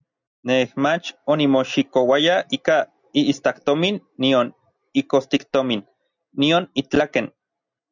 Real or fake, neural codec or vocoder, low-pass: real; none; 7.2 kHz